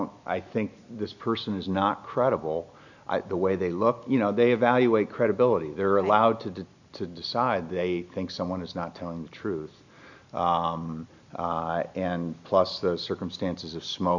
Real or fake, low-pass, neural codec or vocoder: real; 7.2 kHz; none